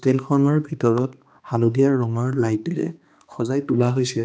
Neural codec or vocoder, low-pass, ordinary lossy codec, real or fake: codec, 16 kHz, 2 kbps, X-Codec, HuBERT features, trained on balanced general audio; none; none; fake